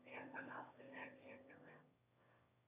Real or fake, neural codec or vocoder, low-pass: fake; autoencoder, 22.05 kHz, a latent of 192 numbers a frame, VITS, trained on one speaker; 3.6 kHz